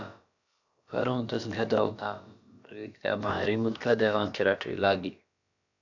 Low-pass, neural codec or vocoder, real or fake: 7.2 kHz; codec, 16 kHz, about 1 kbps, DyCAST, with the encoder's durations; fake